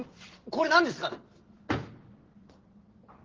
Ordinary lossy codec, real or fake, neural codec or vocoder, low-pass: Opus, 16 kbps; real; none; 7.2 kHz